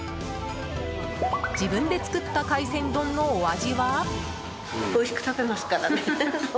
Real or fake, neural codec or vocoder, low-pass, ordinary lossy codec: real; none; none; none